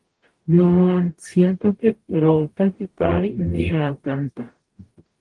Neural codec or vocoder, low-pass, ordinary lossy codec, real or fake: codec, 44.1 kHz, 0.9 kbps, DAC; 10.8 kHz; Opus, 24 kbps; fake